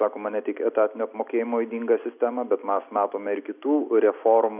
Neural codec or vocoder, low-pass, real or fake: none; 3.6 kHz; real